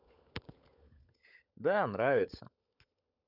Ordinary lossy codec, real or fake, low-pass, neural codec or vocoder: none; fake; 5.4 kHz; codec, 16 kHz, 16 kbps, FunCodec, trained on LibriTTS, 50 frames a second